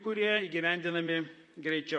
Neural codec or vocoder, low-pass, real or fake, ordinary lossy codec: vocoder, 44.1 kHz, 128 mel bands, Pupu-Vocoder; 10.8 kHz; fake; MP3, 48 kbps